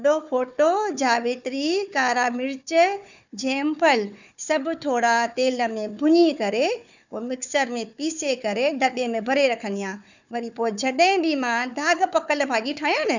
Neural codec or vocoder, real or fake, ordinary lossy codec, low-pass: codec, 16 kHz, 4 kbps, FunCodec, trained on Chinese and English, 50 frames a second; fake; none; 7.2 kHz